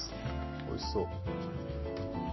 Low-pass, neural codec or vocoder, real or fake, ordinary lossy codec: 7.2 kHz; none; real; MP3, 24 kbps